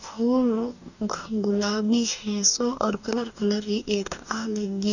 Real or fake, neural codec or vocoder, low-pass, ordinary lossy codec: fake; codec, 44.1 kHz, 2.6 kbps, DAC; 7.2 kHz; none